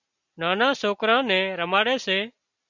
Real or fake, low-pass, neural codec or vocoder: real; 7.2 kHz; none